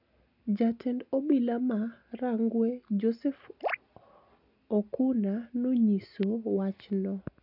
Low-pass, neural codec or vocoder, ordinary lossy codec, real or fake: 5.4 kHz; none; none; real